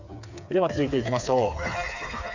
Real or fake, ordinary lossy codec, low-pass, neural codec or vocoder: fake; none; 7.2 kHz; codec, 16 kHz, 4 kbps, FreqCodec, smaller model